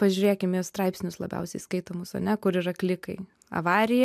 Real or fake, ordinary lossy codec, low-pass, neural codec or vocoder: real; MP3, 96 kbps; 14.4 kHz; none